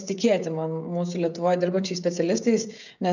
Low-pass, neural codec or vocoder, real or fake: 7.2 kHz; codec, 16 kHz, 16 kbps, FreqCodec, smaller model; fake